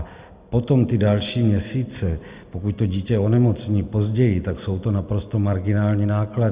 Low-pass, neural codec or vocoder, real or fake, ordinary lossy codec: 3.6 kHz; none; real; Opus, 64 kbps